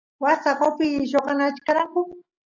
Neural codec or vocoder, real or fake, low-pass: none; real; 7.2 kHz